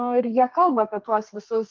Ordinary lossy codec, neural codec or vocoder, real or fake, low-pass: Opus, 32 kbps; codec, 32 kHz, 1.9 kbps, SNAC; fake; 7.2 kHz